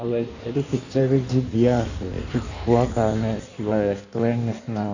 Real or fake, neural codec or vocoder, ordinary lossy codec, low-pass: fake; codec, 16 kHz in and 24 kHz out, 1.1 kbps, FireRedTTS-2 codec; none; 7.2 kHz